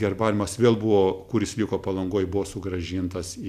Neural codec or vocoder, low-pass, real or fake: none; 14.4 kHz; real